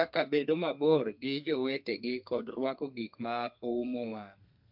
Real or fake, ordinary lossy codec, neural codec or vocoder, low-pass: fake; MP3, 48 kbps; codec, 32 kHz, 1.9 kbps, SNAC; 5.4 kHz